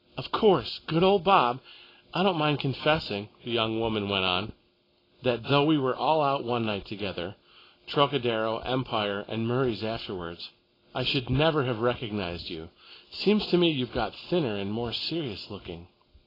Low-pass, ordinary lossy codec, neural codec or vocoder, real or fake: 5.4 kHz; AAC, 24 kbps; none; real